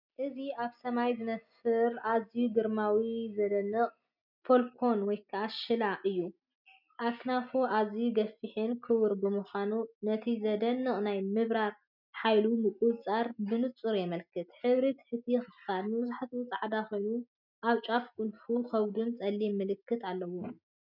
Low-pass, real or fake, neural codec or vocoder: 5.4 kHz; real; none